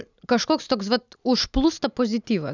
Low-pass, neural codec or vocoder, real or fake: 7.2 kHz; none; real